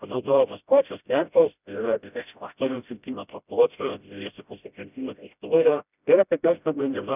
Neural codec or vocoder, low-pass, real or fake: codec, 16 kHz, 0.5 kbps, FreqCodec, smaller model; 3.6 kHz; fake